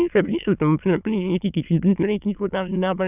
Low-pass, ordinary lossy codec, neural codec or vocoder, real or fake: 3.6 kHz; none; autoencoder, 22.05 kHz, a latent of 192 numbers a frame, VITS, trained on many speakers; fake